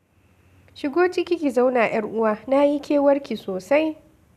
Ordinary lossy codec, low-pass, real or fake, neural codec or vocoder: none; 14.4 kHz; real; none